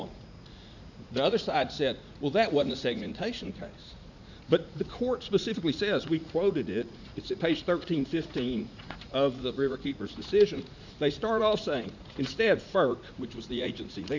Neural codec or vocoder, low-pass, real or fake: vocoder, 44.1 kHz, 80 mel bands, Vocos; 7.2 kHz; fake